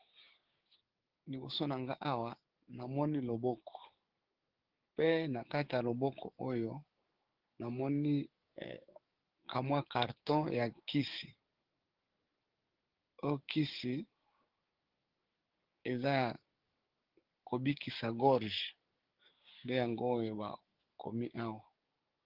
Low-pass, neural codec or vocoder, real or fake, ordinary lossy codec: 5.4 kHz; codec, 16 kHz, 6 kbps, DAC; fake; Opus, 16 kbps